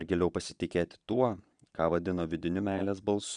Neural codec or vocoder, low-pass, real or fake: vocoder, 22.05 kHz, 80 mel bands, Vocos; 9.9 kHz; fake